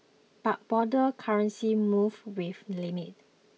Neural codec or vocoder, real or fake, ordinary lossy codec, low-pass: none; real; none; none